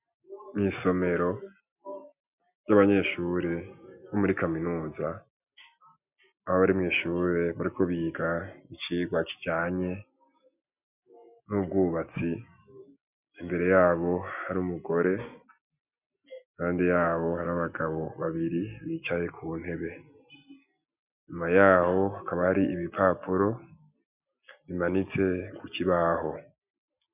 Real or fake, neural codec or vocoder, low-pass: real; none; 3.6 kHz